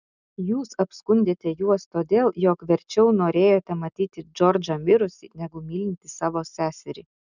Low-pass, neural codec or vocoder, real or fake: 7.2 kHz; none; real